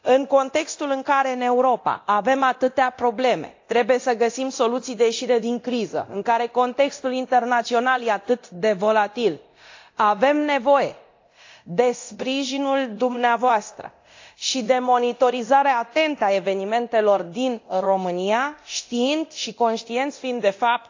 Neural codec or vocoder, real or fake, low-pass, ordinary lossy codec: codec, 24 kHz, 0.9 kbps, DualCodec; fake; 7.2 kHz; AAC, 48 kbps